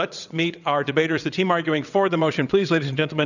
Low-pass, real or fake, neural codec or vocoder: 7.2 kHz; fake; vocoder, 22.05 kHz, 80 mel bands, WaveNeXt